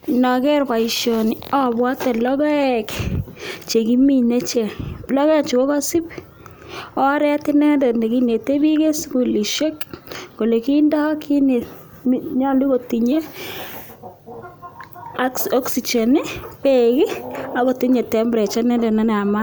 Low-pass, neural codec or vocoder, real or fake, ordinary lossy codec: none; none; real; none